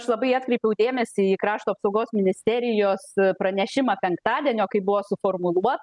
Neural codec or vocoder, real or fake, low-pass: none; real; 10.8 kHz